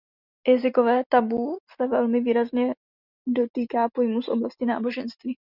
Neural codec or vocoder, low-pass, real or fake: none; 5.4 kHz; real